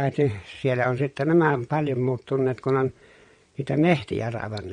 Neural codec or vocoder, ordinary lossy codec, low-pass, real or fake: vocoder, 22.05 kHz, 80 mel bands, WaveNeXt; MP3, 48 kbps; 9.9 kHz; fake